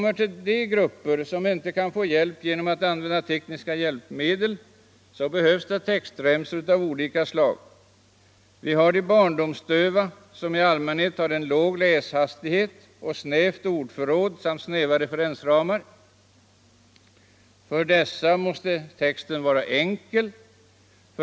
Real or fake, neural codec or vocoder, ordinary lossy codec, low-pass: real; none; none; none